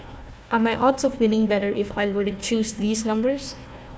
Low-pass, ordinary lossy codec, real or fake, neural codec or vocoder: none; none; fake; codec, 16 kHz, 1 kbps, FunCodec, trained on Chinese and English, 50 frames a second